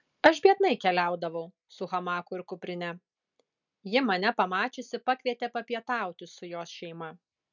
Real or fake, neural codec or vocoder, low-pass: real; none; 7.2 kHz